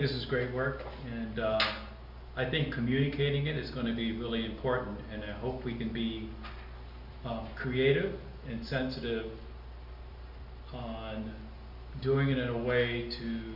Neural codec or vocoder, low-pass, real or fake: none; 5.4 kHz; real